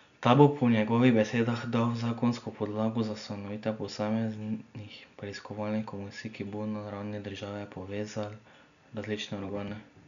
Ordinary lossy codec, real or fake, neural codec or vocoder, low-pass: none; real; none; 7.2 kHz